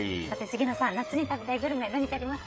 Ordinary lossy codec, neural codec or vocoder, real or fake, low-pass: none; codec, 16 kHz, 8 kbps, FreqCodec, smaller model; fake; none